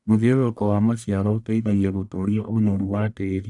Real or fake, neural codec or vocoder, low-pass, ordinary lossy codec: fake; codec, 44.1 kHz, 1.7 kbps, Pupu-Codec; 10.8 kHz; none